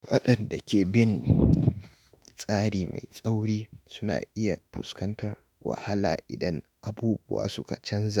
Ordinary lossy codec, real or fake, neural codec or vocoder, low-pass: none; fake; autoencoder, 48 kHz, 32 numbers a frame, DAC-VAE, trained on Japanese speech; 19.8 kHz